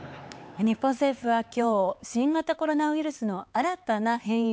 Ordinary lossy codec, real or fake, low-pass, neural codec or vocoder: none; fake; none; codec, 16 kHz, 4 kbps, X-Codec, HuBERT features, trained on LibriSpeech